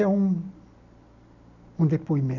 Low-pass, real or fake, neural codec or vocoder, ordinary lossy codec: 7.2 kHz; real; none; none